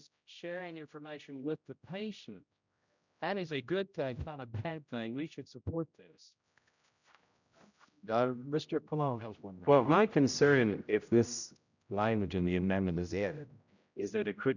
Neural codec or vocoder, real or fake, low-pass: codec, 16 kHz, 0.5 kbps, X-Codec, HuBERT features, trained on general audio; fake; 7.2 kHz